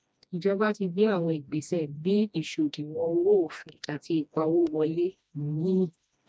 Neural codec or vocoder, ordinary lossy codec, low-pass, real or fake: codec, 16 kHz, 1 kbps, FreqCodec, smaller model; none; none; fake